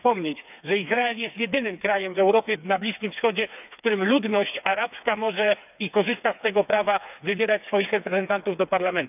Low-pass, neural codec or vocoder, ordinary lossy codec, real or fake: 3.6 kHz; codec, 16 kHz, 4 kbps, FreqCodec, smaller model; none; fake